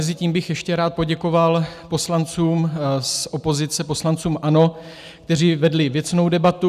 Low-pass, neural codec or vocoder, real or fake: 14.4 kHz; none; real